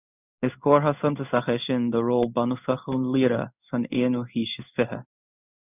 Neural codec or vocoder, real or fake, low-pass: codec, 16 kHz in and 24 kHz out, 1 kbps, XY-Tokenizer; fake; 3.6 kHz